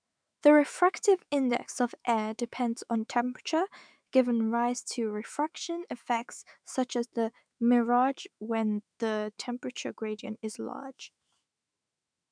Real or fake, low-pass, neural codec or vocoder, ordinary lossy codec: fake; 9.9 kHz; autoencoder, 48 kHz, 128 numbers a frame, DAC-VAE, trained on Japanese speech; none